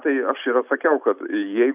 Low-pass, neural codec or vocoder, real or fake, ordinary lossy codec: 3.6 kHz; none; real; AAC, 32 kbps